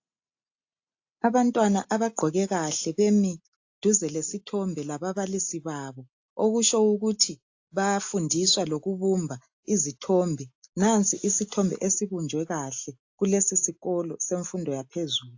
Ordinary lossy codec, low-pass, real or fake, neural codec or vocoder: AAC, 48 kbps; 7.2 kHz; real; none